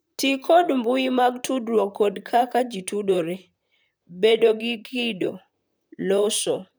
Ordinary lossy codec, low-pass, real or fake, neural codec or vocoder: none; none; fake; vocoder, 44.1 kHz, 128 mel bands, Pupu-Vocoder